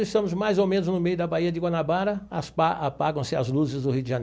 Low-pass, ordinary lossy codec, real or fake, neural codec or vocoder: none; none; real; none